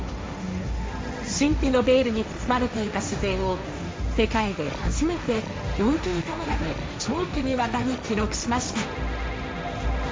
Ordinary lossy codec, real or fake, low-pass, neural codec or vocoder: none; fake; none; codec, 16 kHz, 1.1 kbps, Voila-Tokenizer